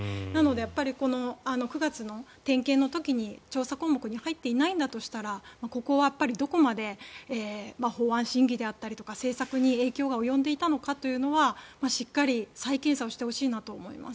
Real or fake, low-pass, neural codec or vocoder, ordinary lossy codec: real; none; none; none